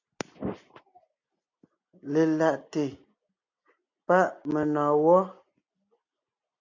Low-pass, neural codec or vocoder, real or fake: 7.2 kHz; none; real